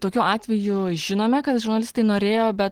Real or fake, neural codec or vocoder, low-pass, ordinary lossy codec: real; none; 19.8 kHz; Opus, 16 kbps